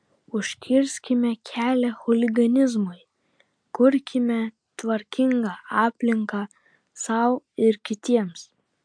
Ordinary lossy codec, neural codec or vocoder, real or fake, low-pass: MP3, 64 kbps; none; real; 9.9 kHz